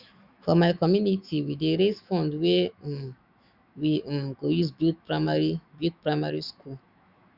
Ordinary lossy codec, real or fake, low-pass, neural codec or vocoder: Opus, 64 kbps; fake; 5.4 kHz; autoencoder, 48 kHz, 128 numbers a frame, DAC-VAE, trained on Japanese speech